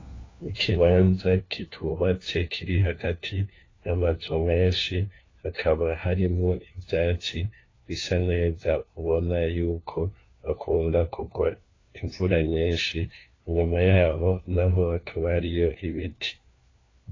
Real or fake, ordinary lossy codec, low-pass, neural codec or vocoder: fake; AAC, 32 kbps; 7.2 kHz; codec, 16 kHz, 1 kbps, FunCodec, trained on LibriTTS, 50 frames a second